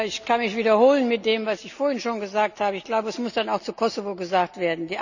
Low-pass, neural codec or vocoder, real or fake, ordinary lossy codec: 7.2 kHz; none; real; none